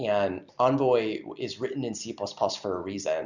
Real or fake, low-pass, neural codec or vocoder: real; 7.2 kHz; none